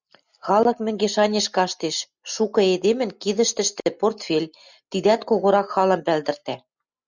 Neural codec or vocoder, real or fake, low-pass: none; real; 7.2 kHz